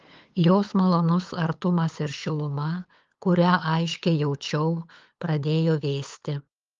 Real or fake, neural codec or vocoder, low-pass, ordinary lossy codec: fake; codec, 16 kHz, 8 kbps, FunCodec, trained on LibriTTS, 25 frames a second; 7.2 kHz; Opus, 32 kbps